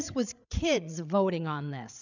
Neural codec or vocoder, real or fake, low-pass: codec, 16 kHz, 8 kbps, FreqCodec, larger model; fake; 7.2 kHz